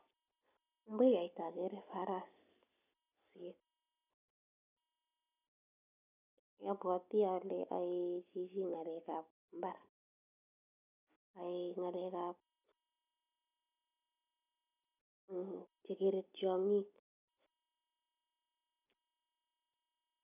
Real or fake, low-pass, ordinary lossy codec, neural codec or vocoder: real; 3.6 kHz; none; none